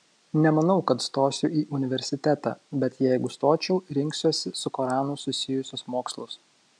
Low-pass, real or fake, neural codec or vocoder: 9.9 kHz; real; none